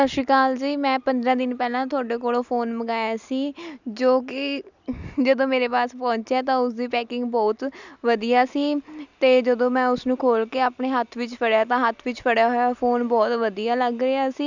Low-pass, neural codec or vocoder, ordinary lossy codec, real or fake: 7.2 kHz; none; none; real